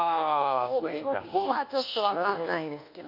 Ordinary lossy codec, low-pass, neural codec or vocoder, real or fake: MP3, 48 kbps; 5.4 kHz; codec, 24 kHz, 1.2 kbps, DualCodec; fake